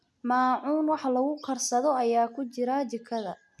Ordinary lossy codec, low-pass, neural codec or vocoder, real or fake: none; none; none; real